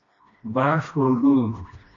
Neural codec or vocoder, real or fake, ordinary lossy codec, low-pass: codec, 16 kHz, 1 kbps, FreqCodec, smaller model; fake; MP3, 48 kbps; 7.2 kHz